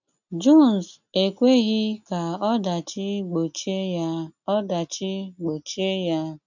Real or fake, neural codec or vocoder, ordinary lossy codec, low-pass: real; none; none; 7.2 kHz